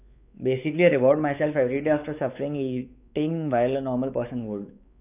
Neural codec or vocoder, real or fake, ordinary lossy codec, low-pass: codec, 16 kHz, 4 kbps, X-Codec, WavLM features, trained on Multilingual LibriSpeech; fake; none; 3.6 kHz